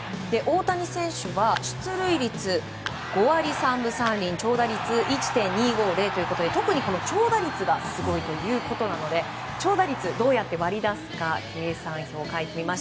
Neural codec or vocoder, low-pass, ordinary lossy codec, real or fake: none; none; none; real